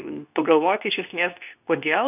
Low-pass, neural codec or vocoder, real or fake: 3.6 kHz; codec, 24 kHz, 0.9 kbps, WavTokenizer, medium speech release version 2; fake